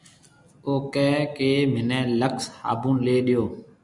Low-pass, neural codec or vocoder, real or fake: 10.8 kHz; none; real